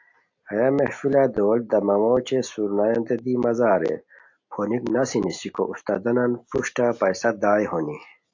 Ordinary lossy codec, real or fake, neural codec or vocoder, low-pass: AAC, 48 kbps; real; none; 7.2 kHz